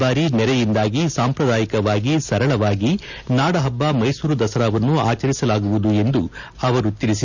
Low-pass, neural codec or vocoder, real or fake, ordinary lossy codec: 7.2 kHz; none; real; none